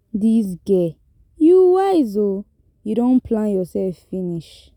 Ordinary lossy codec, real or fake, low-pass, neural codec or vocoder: none; real; 19.8 kHz; none